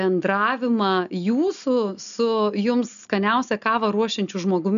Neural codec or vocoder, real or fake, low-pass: none; real; 7.2 kHz